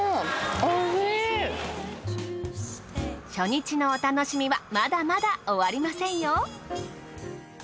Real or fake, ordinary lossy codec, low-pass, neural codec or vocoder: real; none; none; none